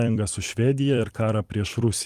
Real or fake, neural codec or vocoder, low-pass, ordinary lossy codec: fake; vocoder, 44.1 kHz, 128 mel bands every 256 samples, BigVGAN v2; 14.4 kHz; Opus, 24 kbps